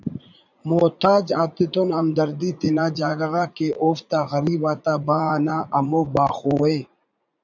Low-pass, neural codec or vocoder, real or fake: 7.2 kHz; vocoder, 44.1 kHz, 80 mel bands, Vocos; fake